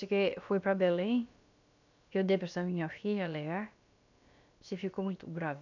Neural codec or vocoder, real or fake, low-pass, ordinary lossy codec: codec, 16 kHz, about 1 kbps, DyCAST, with the encoder's durations; fake; 7.2 kHz; none